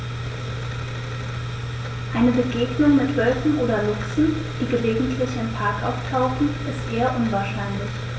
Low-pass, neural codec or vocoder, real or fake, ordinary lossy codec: none; none; real; none